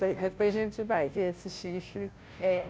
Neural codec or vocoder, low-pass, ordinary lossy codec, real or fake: codec, 16 kHz, 0.5 kbps, FunCodec, trained on Chinese and English, 25 frames a second; none; none; fake